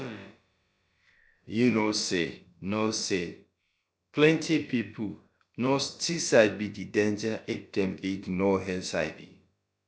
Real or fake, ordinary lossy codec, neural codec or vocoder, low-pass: fake; none; codec, 16 kHz, about 1 kbps, DyCAST, with the encoder's durations; none